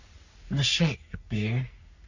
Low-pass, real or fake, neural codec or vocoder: 7.2 kHz; fake; codec, 44.1 kHz, 3.4 kbps, Pupu-Codec